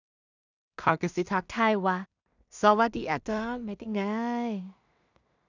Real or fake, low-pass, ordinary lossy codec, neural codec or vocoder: fake; 7.2 kHz; none; codec, 16 kHz in and 24 kHz out, 0.4 kbps, LongCat-Audio-Codec, two codebook decoder